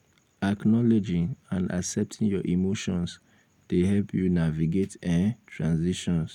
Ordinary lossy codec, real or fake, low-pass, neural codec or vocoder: none; real; 19.8 kHz; none